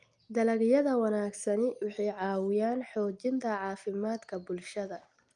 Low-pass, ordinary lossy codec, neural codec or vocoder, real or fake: 9.9 kHz; Opus, 32 kbps; none; real